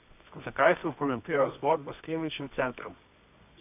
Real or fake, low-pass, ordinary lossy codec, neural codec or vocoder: fake; 3.6 kHz; AAC, 32 kbps; codec, 24 kHz, 0.9 kbps, WavTokenizer, medium music audio release